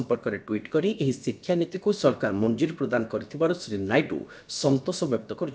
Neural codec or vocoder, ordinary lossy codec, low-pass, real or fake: codec, 16 kHz, about 1 kbps, DyCAST, with the encoder's durations; none; none; fake